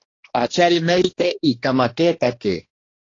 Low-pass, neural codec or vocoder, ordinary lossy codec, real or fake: 7.2 kHz; codec, 16 kHz, 1 kbps, X-Codec, HuBERT features, trained on balanced general audio; AAC, 32 kbps; fake